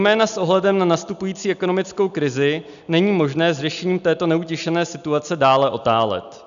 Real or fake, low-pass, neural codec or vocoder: real; 7.2 kHz; none